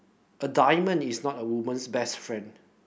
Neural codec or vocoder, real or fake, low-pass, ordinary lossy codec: none; real; none; none